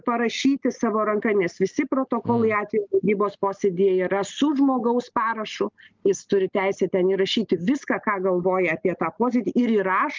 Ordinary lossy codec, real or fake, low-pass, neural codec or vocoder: Opus, 24 kbps; real; 7.2 kHz; none